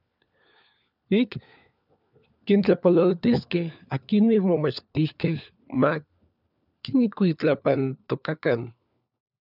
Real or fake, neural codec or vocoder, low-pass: fake; codec, 16 kHz, 4 kbps, FunCodec, trained on LibriTTS, 50 frames a second; 5.4 kHz